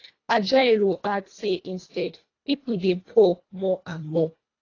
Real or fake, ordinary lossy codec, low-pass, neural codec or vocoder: fake; AAC, 32 kbps; 7.2 kHz; codec, 24 kHz, 1.5 kbps, HILCodec